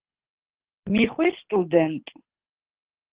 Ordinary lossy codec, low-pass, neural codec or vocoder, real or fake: Opus, 16 kbps; 3.6 kHz; codec, 24 kHz, 6 kbps, HILCodec; fake